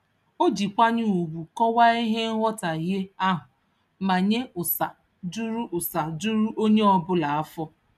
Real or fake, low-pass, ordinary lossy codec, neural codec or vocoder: real; 14.4 kHz; none; none